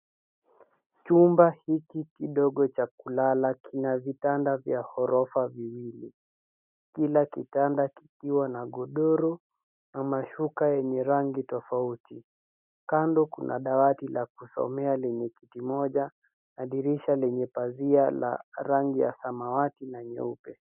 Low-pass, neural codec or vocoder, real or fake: 3.6 kHz; none; real